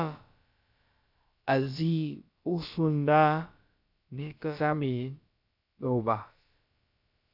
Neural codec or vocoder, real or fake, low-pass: codec, 16 kHz, about 1 kbps, DyCAST, with the encoder's durations; fake; 5.4 kHz